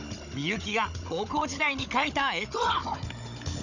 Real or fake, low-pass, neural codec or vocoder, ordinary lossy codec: fake; 7.2 kHz; codec, 16 kHz, 16 kbps, FunCodec, trained on Chinese and English, 50 frames a second; none